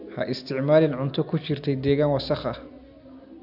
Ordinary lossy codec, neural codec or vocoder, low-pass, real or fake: none; none; 5.4 kHz; real